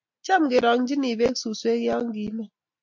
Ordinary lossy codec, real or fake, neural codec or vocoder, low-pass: MP3, 48 kbps; real; none; 7.2 kHz